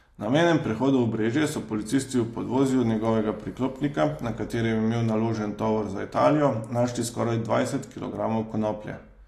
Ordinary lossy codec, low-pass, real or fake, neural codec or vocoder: AAC, 48 kbps; 14.4 kHz; real; none